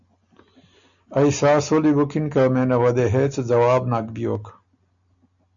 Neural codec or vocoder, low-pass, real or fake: none; 7.2 kHz; real